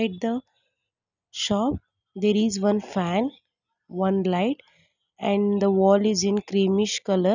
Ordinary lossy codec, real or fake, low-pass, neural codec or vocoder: none; real; 7.2 kHz; none